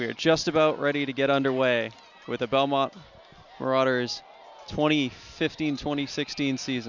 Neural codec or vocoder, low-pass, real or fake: none; 7.2 kHz; real